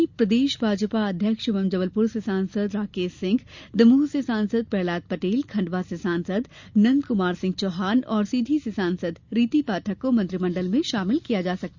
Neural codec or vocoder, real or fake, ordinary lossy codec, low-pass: none; real; Opus, 64 kbps; 7.2 kHz